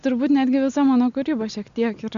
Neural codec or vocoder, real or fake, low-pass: none; real; 7.2 kHz